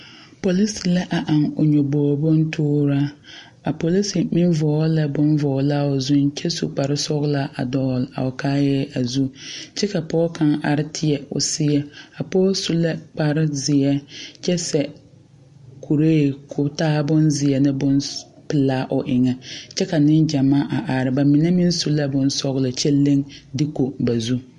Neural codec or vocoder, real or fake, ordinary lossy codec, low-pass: none; real; MP3, 48 kbps; 14.4 kHz